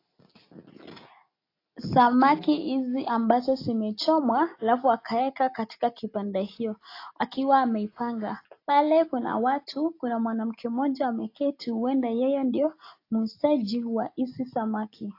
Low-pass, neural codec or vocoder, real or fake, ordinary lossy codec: 5.4 kHz; none; real; AAC, 32 kbps